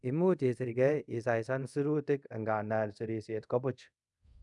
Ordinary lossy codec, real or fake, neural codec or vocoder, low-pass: none; fake; codec, 24 kHz, 0.5 kbps, DualCodec; 10.8 kHz